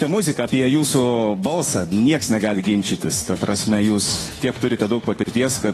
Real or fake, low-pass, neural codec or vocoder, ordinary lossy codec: fake; 19.8 kHz; autoencoder, 48 kHz, 32 numbers a frame, DAC-VAE, trained on Japanese speech; AAC, 32 kbps